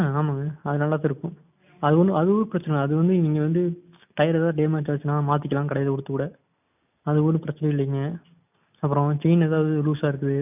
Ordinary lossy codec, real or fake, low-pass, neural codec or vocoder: none; real; 3.6 kHz; none